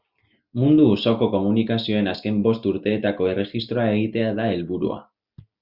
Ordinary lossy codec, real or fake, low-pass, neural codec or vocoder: Opus, 64 kbps; real; 5.4 kHz; none